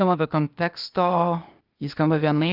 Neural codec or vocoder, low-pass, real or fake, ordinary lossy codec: codec, 16 kHz, 0.8 kbps, ZipCodec; 5.4 kHz; fake; Opus, 24 kbps